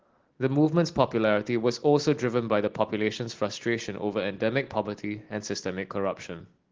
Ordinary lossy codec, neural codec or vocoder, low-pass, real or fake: Opus, 16 kbps; none; 7.2 kHz; real